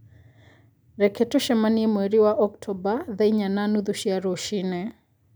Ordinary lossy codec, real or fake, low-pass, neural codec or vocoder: none; real; none; none